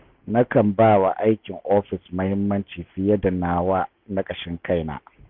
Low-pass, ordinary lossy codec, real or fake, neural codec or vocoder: 5.4 kHz; none; real; none